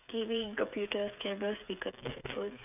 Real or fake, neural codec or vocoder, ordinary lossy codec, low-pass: fake; codec, 16 kHz, 8 kbps, FreqCodec, smaller model; none; 3.6 kHz